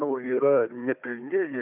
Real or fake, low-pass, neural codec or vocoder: fake; 3.6 kHz; codec, 16 kHz, 4 kbps, FunCodec, trained on Chinese and English, 50 frames a second